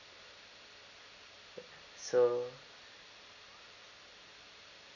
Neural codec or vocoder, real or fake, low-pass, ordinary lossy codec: none; real; 7.2 kHz; none